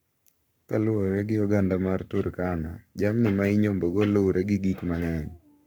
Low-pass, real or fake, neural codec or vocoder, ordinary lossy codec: none; fake; codec, 44.1 kHz, 7.8 kbps, Pupu-Codec; none